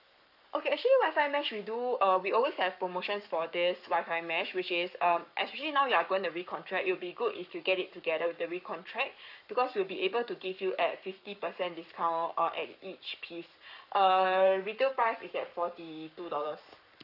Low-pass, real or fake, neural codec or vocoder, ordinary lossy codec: 5.4 kHz; fake; codec, 44.1 kHz, 7.8 kbps, Pupu-Codec; none